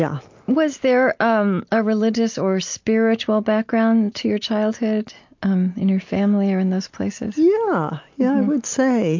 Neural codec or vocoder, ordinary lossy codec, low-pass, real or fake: none; MP3, 48 kbps; 7.2 kHz; real